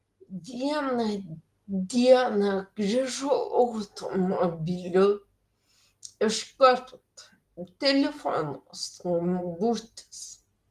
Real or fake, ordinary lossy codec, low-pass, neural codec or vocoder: real; Opus, 24 kbps; 14.4 kHz; none